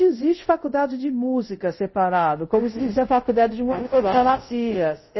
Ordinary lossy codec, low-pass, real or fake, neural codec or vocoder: MP3, 24 kbps; 7.2 kHz; fake; codec, 24 kHz, 0.5 kbps, DualCodec